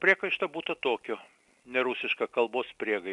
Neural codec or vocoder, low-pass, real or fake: none; 10.8 kHz; real